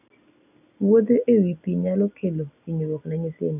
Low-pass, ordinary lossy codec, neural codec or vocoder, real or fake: 3.6 kHz; none; none; real